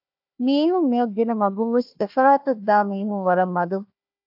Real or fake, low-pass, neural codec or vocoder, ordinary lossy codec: fake; 5.4 kHz; codec, 16 kHz, 1 kbps, FunCodec, trained on Chinese and English, 50 frames a second; AAC, 48 kbps